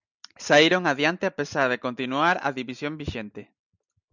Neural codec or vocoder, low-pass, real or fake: none; 7.2 kHz; real